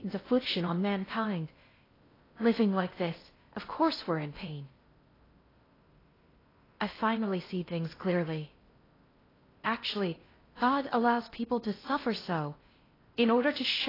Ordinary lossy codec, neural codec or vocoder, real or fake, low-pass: AAC, 24 kbps; codec, 16 kHz in and 24 kHz out, 0.6 kbps, FocalCodec, streaming, 2048 codes; fake; 5.4 kHz